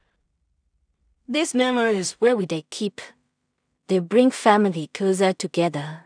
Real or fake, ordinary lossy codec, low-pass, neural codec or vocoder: fake; none; 9.9 kHz; codec, 16 kHz in and 24 kHz out, 0.4 kbps, LongCat-Audio-Codec, two codebook decoder